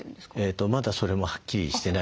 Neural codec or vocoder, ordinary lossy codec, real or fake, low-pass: none; none; real; none